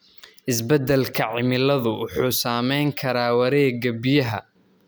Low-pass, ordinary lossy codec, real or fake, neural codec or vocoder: none; none; real; none